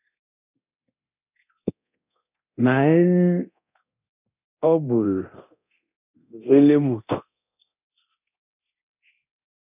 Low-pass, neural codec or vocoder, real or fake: 3.6 kHz; codec, 24 kHz, 0.9 kbps, DualCodec; fake